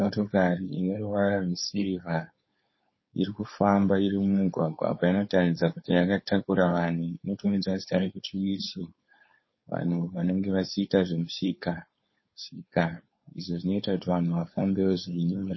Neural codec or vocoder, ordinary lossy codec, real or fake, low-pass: codec, 16 kHz, 4.8 kbps, FACodec; MP3, 24 kbps; fake; 7.2 kHz